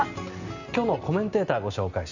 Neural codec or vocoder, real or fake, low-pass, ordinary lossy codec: none; real; 7.2 kHz; none